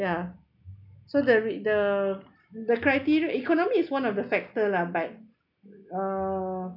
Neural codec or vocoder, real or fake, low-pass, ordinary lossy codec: none; real; 5.4 kHz; none